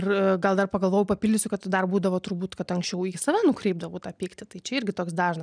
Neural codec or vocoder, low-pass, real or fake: none; 9.9 kHz; real